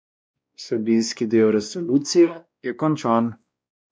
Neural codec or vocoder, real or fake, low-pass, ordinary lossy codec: codec, 16 kHz, 1 kbps, X-Codec, WavLM features, trained on Multilingual LibriSpeech; fake; none; none